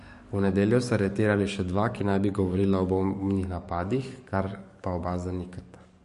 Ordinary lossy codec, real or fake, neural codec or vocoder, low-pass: MP3, 48 kbps; fake; codec, 44.1 kHz, 7.8 kbps, DAC; 14.4 kHz